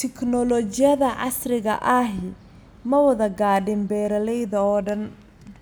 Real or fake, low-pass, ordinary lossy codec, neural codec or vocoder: real; none; none; none